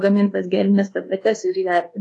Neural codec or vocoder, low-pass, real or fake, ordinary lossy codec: codec, 24 kHz, 1.2 kbps, DualCodec; 10.8 kHz; fake; AAC, 48 kbps